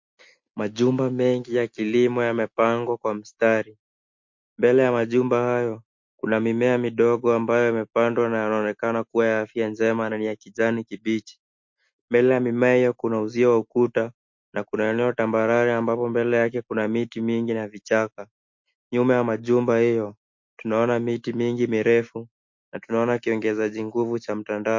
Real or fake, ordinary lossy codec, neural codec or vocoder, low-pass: real; MP3, 48 kbps; none; 7.2 kHz